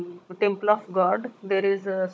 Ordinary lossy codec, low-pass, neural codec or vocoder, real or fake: none; none; codec, 16 kHz, 16 kbps, FunCodec, trained on Chinese and English, 50 frames a second; fake